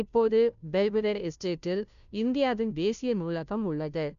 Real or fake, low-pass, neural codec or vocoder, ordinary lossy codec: fake; 7.2 kHz; codec, 16 kHz, 0.5 kbps, FunCodec, trained on Chinese and English, 25 frames a second; MP3, 96 kbps